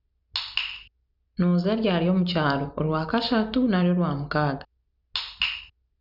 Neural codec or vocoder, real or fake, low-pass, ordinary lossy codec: none; real; 5.4 kHz; none